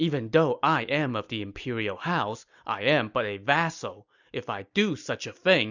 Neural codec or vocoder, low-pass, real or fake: none; 7.2 kHz; real